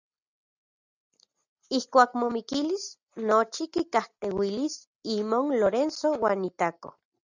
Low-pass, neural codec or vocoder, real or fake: 7.2 kHz; none; real